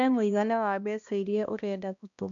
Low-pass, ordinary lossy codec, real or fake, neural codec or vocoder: 7.2 kHz; none; fake; codec, 16 kHz, 1 kbps, X-Codec, HuBERT features, trained on balanced general audio